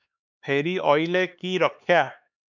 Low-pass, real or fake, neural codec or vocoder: 7.2 kHz; fake; codec, 16 kHz, 4 kbps, X-Codec, HuBERT features, trained on LibriSpeech